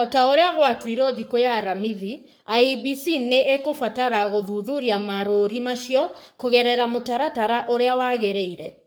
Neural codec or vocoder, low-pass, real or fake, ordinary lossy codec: codec, 44.1 kHz, 3.4 kbps, Pupu-Codec; none; fake; none